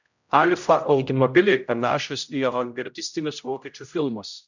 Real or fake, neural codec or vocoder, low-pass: fake; codec, 16 kHz, 0.5 kbps, X-Codec, HuBERT features, trained on general audio; 7.2 kHz